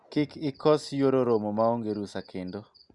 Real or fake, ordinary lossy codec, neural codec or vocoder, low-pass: real; Opus, 64 kbps; none; 10.8 kHz